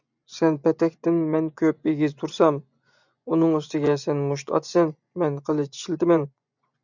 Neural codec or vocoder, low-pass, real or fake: vocoder, 24 kHz, 100 mel bands, Vocos; 7.2 kHz; fake